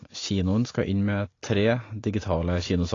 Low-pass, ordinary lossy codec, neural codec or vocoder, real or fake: 7.2 kHz; AAC, 32 kbps; none; real